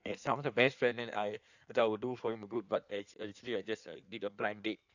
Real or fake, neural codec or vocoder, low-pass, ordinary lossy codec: fake; codec, 16 kHz in and 24 kHz out, 1.1 kbps, FireRedTTS-2 codec; 7.2 kHz; none